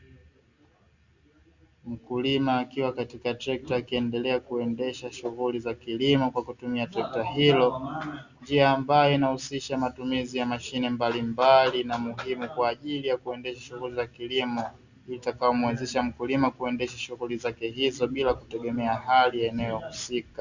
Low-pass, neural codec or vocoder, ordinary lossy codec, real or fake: 7.2 kHz; none; Opus, 64 kbps; real